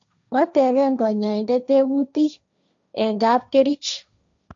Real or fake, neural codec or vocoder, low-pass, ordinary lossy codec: fake; codec, 16 kHz, 1.1 kbps, Voila-Tokenizer; 7.2 kHz; AAC, 64 kbps